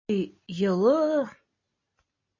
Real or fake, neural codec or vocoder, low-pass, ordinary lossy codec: real; none; 7.2 kHz; MP3, 32 kbps